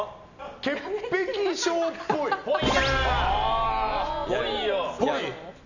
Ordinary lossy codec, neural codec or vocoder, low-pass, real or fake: none; none; 7.2 kHz; real